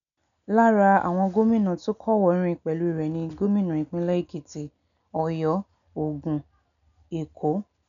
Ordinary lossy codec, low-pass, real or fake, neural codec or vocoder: none; 7.2 kHz; real; none